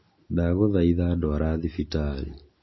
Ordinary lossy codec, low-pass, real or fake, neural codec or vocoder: MP3, 24 kbps; 7.2 kHz; real; none